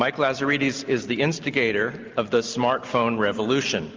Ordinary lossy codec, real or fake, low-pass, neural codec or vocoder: Opus, 24 kbps; real; 7.2 kHz; none